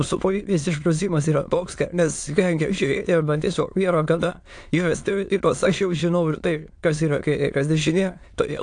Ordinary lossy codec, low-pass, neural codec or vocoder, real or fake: AAC, 64 kbps; 9.9 kHz; autoencoder, 22.05 kHz, a latent of 192 numbers a frame, VITS, trained on many speakers; fake